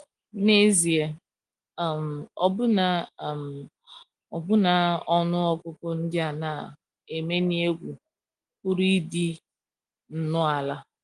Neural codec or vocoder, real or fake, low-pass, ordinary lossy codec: none; real; 10.8 kHz; Opus, 24 kbps